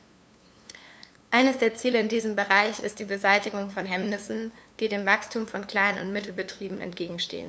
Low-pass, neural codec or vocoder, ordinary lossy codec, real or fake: none; codec, 16 kHz, 2 kbps, FunCodec, trained on LibriTTS, 25 frames a second; none; fake